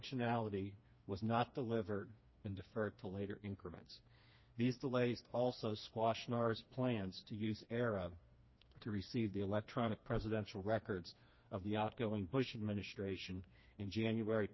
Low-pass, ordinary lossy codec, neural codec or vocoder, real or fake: 7.2 kHz; MP3, 24 kbps; codec, 16 kHz, 2 kbps, FreqCodec, smaller model; fake